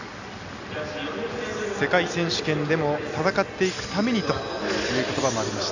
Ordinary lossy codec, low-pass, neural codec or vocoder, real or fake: none; 7.2 kHz; none; real